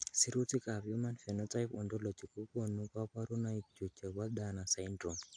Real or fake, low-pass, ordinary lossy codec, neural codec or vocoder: real; 9.9 kHz; AAC, 64 kbps; none